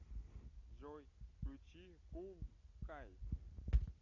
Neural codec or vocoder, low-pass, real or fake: none; 7.2 kHz; real